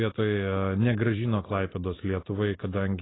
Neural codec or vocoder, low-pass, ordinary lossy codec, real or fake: none; 7.2 kHz; AAC, 16 kbps; real